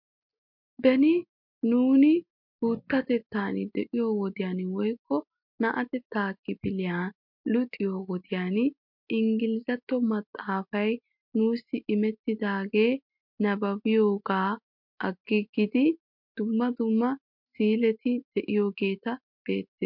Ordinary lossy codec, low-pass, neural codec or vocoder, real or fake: MP3, 48 kbps; 5.4 kHz; none; real